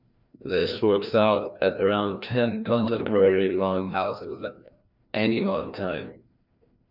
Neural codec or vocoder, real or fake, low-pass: codec, 16 kHz, 1 kbps, FreqCodec, larger model; fake; 5.4 kHz